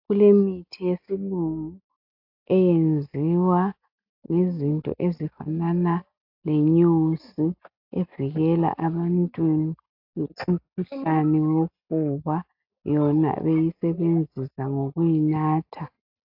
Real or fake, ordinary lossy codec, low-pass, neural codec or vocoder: real; AAC, 48 kbps; 5.4 kHz; none